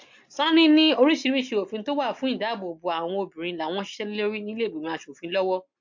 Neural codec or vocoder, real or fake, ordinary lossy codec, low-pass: none; real; MP3, 48 kbps; 7.2 kHz